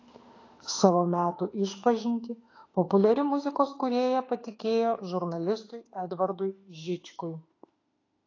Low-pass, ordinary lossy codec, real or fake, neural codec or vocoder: 7.2 kHz; AAC, 32 kbps; fake; autoencoder, 48 kHz, 32 numbers a frame, DAC-VAE, trained on Japanese speech